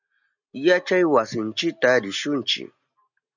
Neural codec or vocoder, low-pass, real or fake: none; 7.2 kHz; real